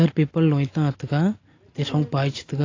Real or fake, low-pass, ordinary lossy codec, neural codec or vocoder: real; 7.2 kHz; AAC, 32 kbps; none